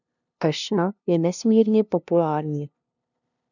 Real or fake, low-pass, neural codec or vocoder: fake; 7.2 kHz; codec, 16 kHz, 0.5 kbps, FunCodec, trained on LibriTTS, 25 frames a second